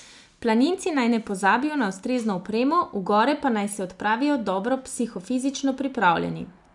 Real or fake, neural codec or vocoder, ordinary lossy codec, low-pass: real; none; none; 10.8 kHz